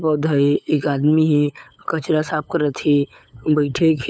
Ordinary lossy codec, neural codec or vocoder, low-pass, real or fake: none; codec, 16 kHz, 8 kbps, FunCodec, trained on LibriTTS, 25 frames a second; none; fake